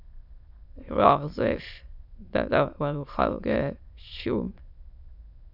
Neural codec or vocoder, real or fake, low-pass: autoencoder, 22.05 kHz, a latent of 192 numbers a frame, VITS, trained on many speakers; fake; 5.4 kHz